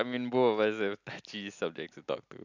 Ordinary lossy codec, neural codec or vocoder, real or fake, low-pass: none; none; real; 7.2 kHz